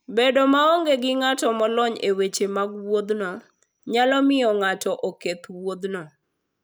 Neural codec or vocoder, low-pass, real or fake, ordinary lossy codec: none; none; real; none